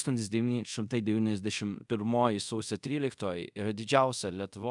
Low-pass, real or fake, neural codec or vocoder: 10.8 kHz; fake; codec, 24 kHz, 0.5 kbps, DualCodec